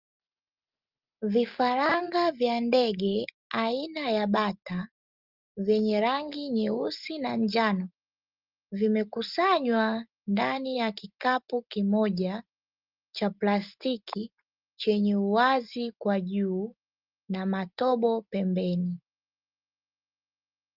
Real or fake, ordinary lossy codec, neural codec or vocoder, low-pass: real; Opus, 32 kbps; none; 5.4 kHz